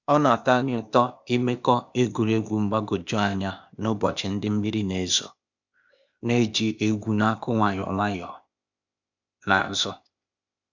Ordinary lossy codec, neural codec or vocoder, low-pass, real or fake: none; codec, 16 kHz, 0.8 kbps, ZipCodec; 7.2 kHz; fake